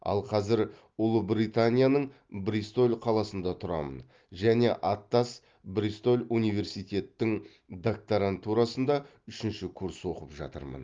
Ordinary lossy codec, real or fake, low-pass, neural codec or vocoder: Opus, 32 kbps; real; 7.2 kHz; none